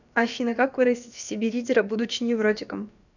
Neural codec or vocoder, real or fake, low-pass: codec, 16 kHz, about 1 kbps, DyCAST, with the encoder's durations; fake; 7.2 kHz